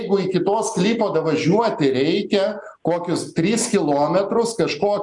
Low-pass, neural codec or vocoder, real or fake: 10.8 kHz; none; real